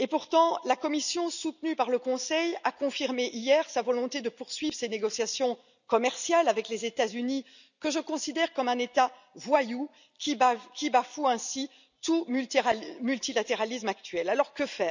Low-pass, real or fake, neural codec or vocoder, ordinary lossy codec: 7.2 kHz; real; none; none